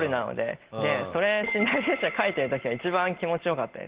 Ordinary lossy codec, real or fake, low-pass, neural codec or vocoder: Opus, 16 kbps; real; 3.6 kHz; none